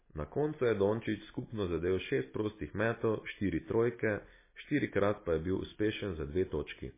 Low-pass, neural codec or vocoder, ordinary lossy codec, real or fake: 3.6 kHz; none; MP3, 16 kbps; real